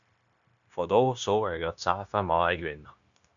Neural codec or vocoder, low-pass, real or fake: codec, 16 kHz, 0.9 kbps, LongCat-Audio-Codec; 7.2 kHz; fake